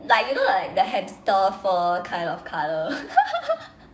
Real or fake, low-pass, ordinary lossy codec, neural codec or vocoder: fake; none; none; codec, 16 kHz, 6 kbps, DAC